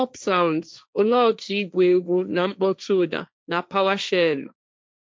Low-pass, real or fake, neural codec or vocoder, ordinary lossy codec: none; fake; codec, 16 kHz, 1.1 kbps, Voila-Tokenizer; none